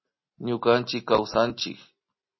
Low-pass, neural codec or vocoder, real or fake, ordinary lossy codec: 7.2 kHz; none; real; MP3, 24 kbps